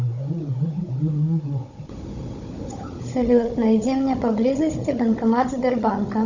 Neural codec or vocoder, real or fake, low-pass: codec, 16 kHz, 16 kbps, FunCodec, trained on Chinese and English, 50 frames a second; fake; 7.2 kHz